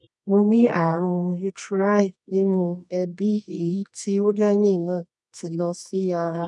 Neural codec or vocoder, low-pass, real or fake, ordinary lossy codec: codec, 24 kHz, 0.9 kbps, WavTokenizer, medium music audio release; 10.8 kHz; fake; none